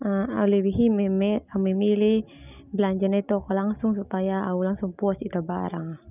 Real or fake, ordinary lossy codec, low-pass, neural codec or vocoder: real; none; 3.6 kHz; none